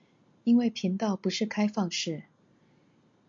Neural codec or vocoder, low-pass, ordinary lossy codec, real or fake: none; 7.2 kHz; MP3, 48 kbps; real